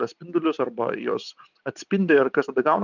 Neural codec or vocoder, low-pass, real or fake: none; 7.2 kHz; real